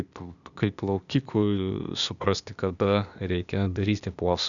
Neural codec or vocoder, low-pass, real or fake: codec, 16 kHz, 0.8 kbps, ZipCodec; 7.2 kHz; fake